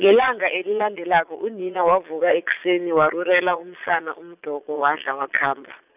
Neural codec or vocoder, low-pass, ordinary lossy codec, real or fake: vocoder, 22.05 kHz, 80 mel bands, Vocos; 3.6 kHz; none; fake